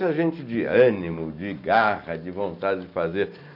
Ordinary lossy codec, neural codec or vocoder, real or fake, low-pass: none; none; real; 5.4 kHz